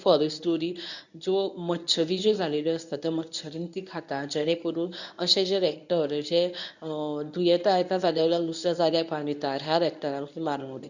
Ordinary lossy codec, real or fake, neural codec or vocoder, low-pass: none; fake; codec, 24 kHz, 0.9 kbps, WavTokenizer, medium speech release version 1; 7.2 kHz